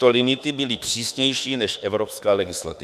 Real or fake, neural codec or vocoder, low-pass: fake; autoencoder, 48 kHz, 32 numbers a frame, DAC-VAE, trained on Japanese speech; 14.4 kHz